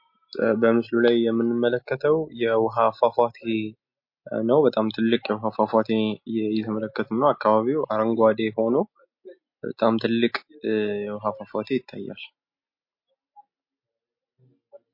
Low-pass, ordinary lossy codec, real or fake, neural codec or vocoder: 5.4 kHz; MP3, 32 kbps; real; none